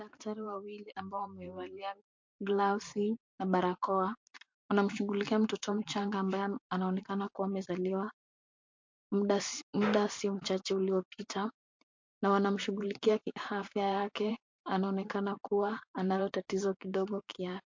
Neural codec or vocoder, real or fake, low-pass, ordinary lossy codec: vocoder, 44.1 kHz, 128 mel bands every 512 samples, BigVGAN v2; fake; 7.2 kHz; MP3, 48 kbps